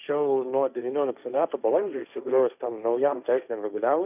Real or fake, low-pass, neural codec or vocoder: fake; 3.6 kHz; codec, 16 kHz, 1.1 kbps, Voila-Tokenizer